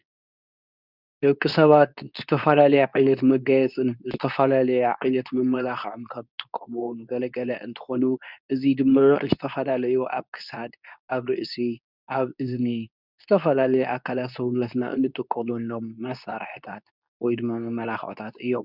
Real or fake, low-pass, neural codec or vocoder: fake; 5.4 kHz; codec, 24 kHz, 0.9 kbps, WavTokenizer, medium speech release version 2